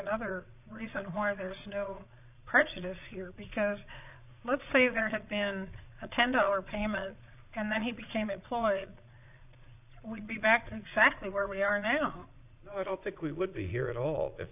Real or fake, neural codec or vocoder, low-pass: fake; vocoder, 22.05 kHz, 80 mel bands, Vocos; 3.6 kHz